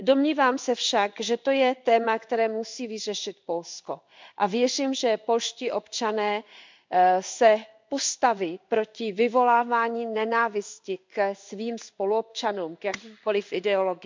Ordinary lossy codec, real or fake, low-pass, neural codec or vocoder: MP3, 64 kbps; fake; 7.2 kHz; codec, 16 kHz in and 24 kHz out, 1 kbps, XY-Tokenizer